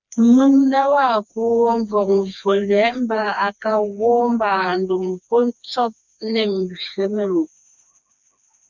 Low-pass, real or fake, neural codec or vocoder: 7.2 kHz; fake; codec, 16 kHz, 2 kbps, FreqCodec, smaller model